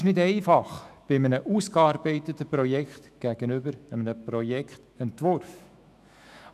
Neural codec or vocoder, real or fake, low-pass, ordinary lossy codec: autoencoder, 48 kHz, 128 numbers a frame, DAC-VAE, trained on Japanese speech; fake; 14.4 kHz; none